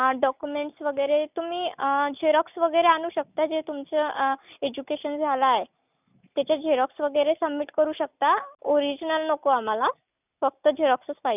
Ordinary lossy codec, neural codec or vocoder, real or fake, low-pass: none; none; real; 3.6 kHz